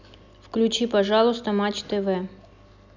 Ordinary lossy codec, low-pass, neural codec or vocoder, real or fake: none; 7.2 kHz; none; real